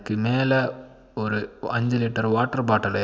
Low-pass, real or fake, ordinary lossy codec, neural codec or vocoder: none; real; none; none